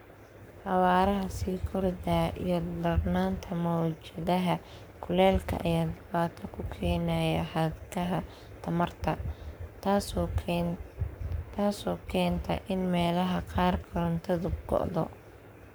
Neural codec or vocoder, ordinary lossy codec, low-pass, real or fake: codec, 44.1 kHz, 7.8 kbps, Pupu-Codec; none; none; fake